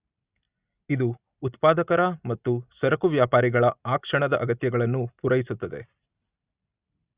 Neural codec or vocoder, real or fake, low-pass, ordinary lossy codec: none; real; 3.6 kHz; Opus, 64 kbps